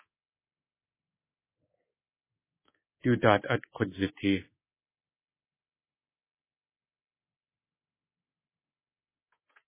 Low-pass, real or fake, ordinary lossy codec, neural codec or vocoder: 3.6 kHz; fake; MP3, 16 kbps; codec, 24 kHz, 3.1 kbps, DualCodec